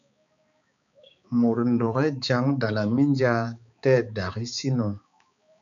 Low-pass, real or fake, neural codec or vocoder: 7.2 kHz; fake; codec, 16 kHz, 4 kbps, X-Codec, HuBERT features, trained on balanced general audio